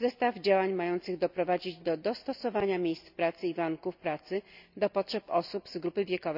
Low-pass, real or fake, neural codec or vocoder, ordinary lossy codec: 5.4 kHz; real; none; none